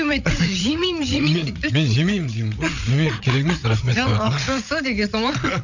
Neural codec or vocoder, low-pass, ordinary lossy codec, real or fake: codec, 16 kHz, 8 kbps, FreqCodec, larger model; 7.2 kHz; none; fake